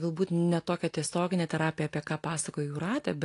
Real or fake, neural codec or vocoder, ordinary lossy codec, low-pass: real; none; AAC, 48 kbps; 10.8 kHz